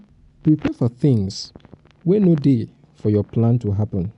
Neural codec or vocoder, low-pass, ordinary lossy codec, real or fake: none; 10.8 kHz; none; real